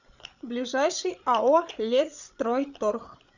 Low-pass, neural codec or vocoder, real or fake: 7.2 kHz; codec, 16 kHz, 8 kbps, FreqCodec, larger model; fake